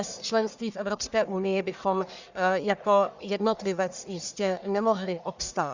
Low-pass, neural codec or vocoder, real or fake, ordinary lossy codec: 7.2 kHz; codec, 44.1 kHz, 1.7 kbps, Pupu-Codec; fake; Opus, 64 kbps